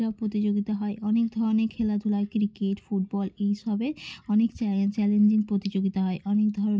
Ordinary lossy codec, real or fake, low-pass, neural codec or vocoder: none; real; none; none